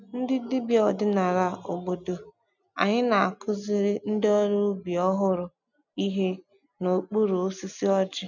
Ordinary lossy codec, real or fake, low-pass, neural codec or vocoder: none; real; 7.2 kHz; none